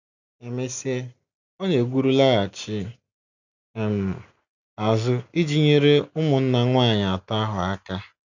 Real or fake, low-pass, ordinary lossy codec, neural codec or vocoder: real; 7.2 kHz; none; none